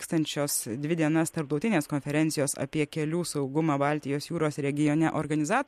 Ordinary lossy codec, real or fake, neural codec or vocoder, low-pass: MP3, 64 kbps; real; none; 14.4 kHz